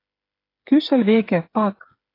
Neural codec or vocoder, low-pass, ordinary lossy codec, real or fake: codec, 16 kHz, 8 kbps, FreqCodec, smaller model; 5.4 kHz; AAC, 24 kbps; fake